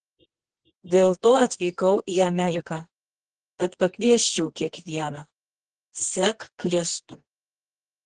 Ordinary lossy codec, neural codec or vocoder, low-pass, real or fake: Opus, 16 kbps; codec, 24 kHz, 0.9 kbps, WavTokenizer, medium music audio release; 10.8 kHz; fake